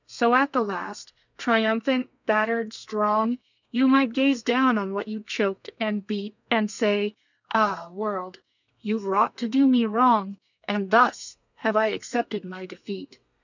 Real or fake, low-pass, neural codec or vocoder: fake; 7.2 kHz; codec, 32 kHz, 1.9 kbps, SNAC